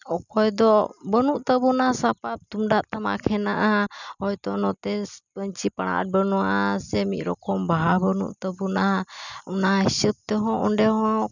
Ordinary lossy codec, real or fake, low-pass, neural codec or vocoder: none; real; 7.2 kHz; none